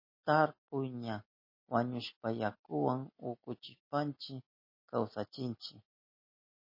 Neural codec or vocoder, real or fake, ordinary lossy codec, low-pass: none; real; MP3, 24 kbps; 5.4 kHz